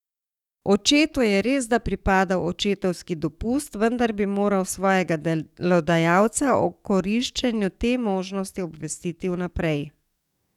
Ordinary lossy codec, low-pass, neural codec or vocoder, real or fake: none; 19.8 kHz; codec, 44.1 kHz, 7.8 kbps, DAC; fake